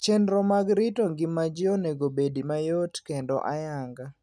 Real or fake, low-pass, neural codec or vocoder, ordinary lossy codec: real; none; none; none